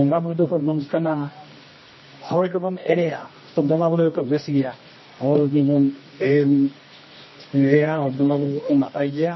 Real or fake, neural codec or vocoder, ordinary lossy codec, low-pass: fake; codec, 24 kHz, 0.9 kbps, WavTokenizer, medium music audio release; MP3, 24 kbps; 7.2 kHz